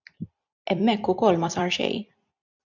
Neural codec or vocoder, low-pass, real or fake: vocoder, 44.1 kHz, 128 mel bands every 256 samples, BigVGAN v2; 7.2 kHz; fake